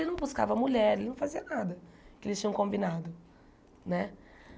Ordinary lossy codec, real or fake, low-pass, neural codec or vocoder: none; real; none; none